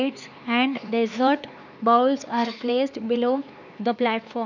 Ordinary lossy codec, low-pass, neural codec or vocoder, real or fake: none; 7.2 kHz; codec, 16 kHz, 4 kbps, X-Codec, HuBERT features, trained on LibriSpeech; fake